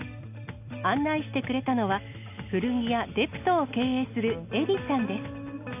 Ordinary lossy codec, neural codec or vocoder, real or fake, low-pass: none; none; real; 3.6 kHz